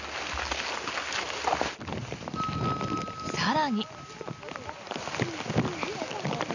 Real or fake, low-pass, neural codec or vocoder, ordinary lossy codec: real; 7.2 kHz; none; none